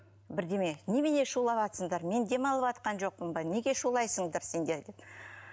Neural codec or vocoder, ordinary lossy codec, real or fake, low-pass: none; none; real; none